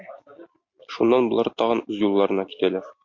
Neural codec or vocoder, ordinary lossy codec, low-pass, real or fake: none; MP3, 48 kbps; 7.2 kHz; real